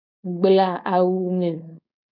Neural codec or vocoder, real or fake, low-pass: codec, 16 kHz, 4.8 kbps, FACodec; fake; 5.4 kHz